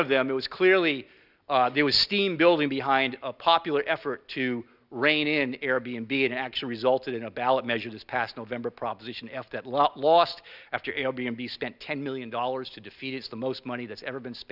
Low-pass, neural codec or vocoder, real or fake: 5.4 kHz; none; real